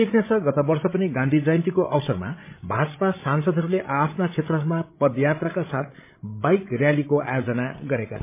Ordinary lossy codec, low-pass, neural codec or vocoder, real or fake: MP3, 24 kbps; 3.6 kHz; codec, 16 kHz, 16 kbps, FreqCodec, larger model; fake